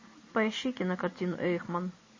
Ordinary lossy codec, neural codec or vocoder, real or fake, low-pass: MP3, 32 kbps; none; real; 7.2 kHz